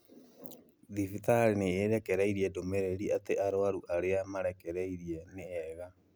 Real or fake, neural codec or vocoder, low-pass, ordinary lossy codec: real; none; none; none